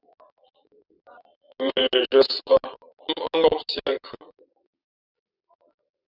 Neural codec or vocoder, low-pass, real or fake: vocoder, 22.05 kHz, 80 mel bands, Vocos; 5.4 kHz; fake